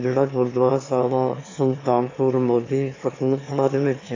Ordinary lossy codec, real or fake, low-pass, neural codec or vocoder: AAC, 32 kbps; fake; 7.2 kHz; autoencoder, 22.05 kHz, a latent of 192 numbers a frame, VITS, trained on one speaker